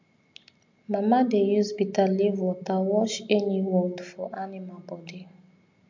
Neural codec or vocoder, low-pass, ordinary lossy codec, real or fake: none; 7.2 kHz; MP3, 64 kbps; real